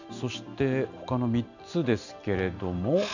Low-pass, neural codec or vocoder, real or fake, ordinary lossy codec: 7.2 kHz; none; real; none